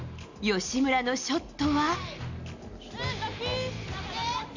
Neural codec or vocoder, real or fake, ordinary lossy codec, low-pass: none; real; none; 7.2 kHz